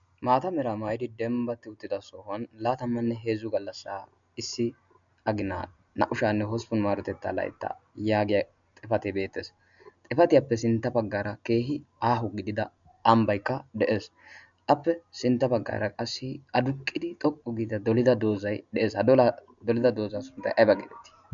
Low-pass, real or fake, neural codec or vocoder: 7.2 kHz; real; none